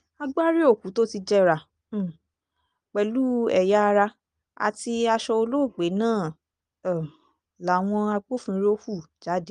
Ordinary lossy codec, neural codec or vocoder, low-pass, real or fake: Opus, 32 kbps; none; 9.9 kHz; real